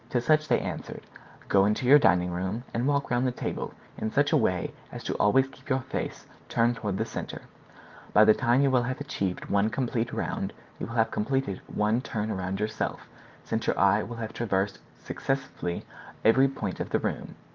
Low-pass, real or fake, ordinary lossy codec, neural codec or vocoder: 7.2 kHz; real; Opus, 32 kbps; none